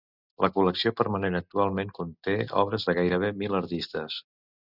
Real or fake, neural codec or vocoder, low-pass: real; none; 5.4 kHz